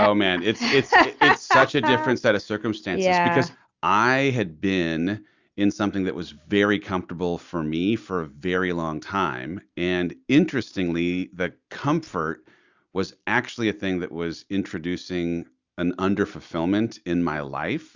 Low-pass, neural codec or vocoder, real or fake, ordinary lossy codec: 7.2 kHz; none; real; Opus, 64 kbps